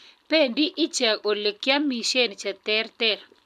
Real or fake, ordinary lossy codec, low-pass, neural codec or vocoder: real; none; 14.4 kHz; none